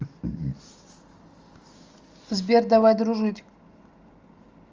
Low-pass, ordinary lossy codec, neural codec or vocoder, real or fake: 7.2 kHz; Opus, 32 kbps; none; real